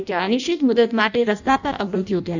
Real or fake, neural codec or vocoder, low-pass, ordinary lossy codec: fake; codec, 16 kHz in and 24 kHz out, 0.6 kbps, FireRedTTS-2 codec; 7.2 kHz; none